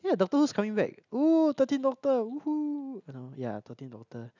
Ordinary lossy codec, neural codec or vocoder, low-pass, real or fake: none; none; 7.2 kHz; real